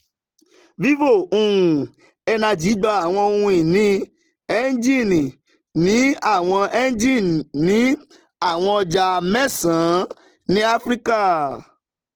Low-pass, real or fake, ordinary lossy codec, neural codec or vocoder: 19.8 kHz; real; Opus, 16 kbps; none